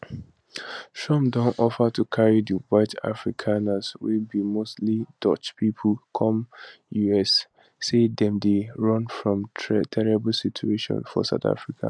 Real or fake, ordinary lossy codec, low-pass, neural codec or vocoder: real; none; none; none